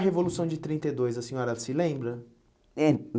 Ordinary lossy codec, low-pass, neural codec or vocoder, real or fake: none; none; none; real